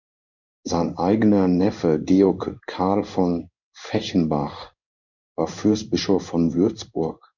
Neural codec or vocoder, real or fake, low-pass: codec, 16 kHz in and 24 kHz out, 1 kbps, XY-Tokenizer; fake; 7.2 kHz